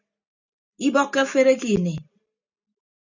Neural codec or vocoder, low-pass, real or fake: none; 7.2 kHz; real